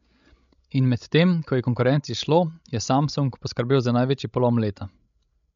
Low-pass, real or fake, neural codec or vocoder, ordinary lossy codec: 7.2 kHz; fake; codec, 16 kHz, 16 kbps, FreqCodec, larger model; MP3, 64 kbps